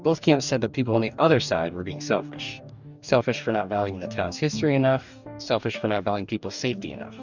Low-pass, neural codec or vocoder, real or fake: 7.2 kHz; codec, 44.1 kHz, 2.6 kbps, DAC; fake